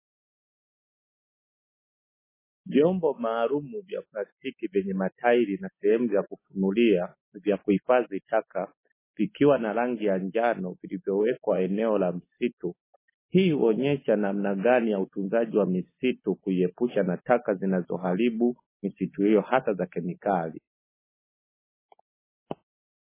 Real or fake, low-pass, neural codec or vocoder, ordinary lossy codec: real; 3.6 kHz; none; MP3, 16 kbps